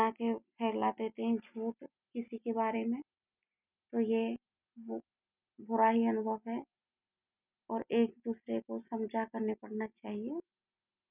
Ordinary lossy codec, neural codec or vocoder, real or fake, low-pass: none; none; real; 3.6 kHz